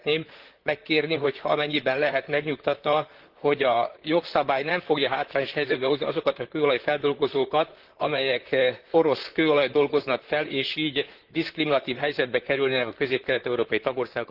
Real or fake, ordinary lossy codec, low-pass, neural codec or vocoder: fake; Opus, 16 kbps; 5.4 kHz; vocoder, 44.1 kHz, 128 mel bands, Pupu-Vocoder